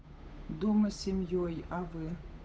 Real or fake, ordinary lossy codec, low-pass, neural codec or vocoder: fake; none; none; codec, 16 kHz, 8 kbps, FunCodec, trained on Chinese and English, 25 frames a second